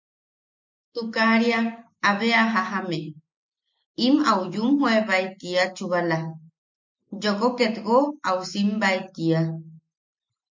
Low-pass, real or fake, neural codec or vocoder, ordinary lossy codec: 7.2 kHz; real; none; MP3, 48 kbps